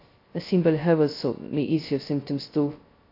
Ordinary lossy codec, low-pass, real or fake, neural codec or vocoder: AAC, 32 kbps; 5.4 kHz; fake; codec, 16 kHz, 0.2 kbps, FocalCodec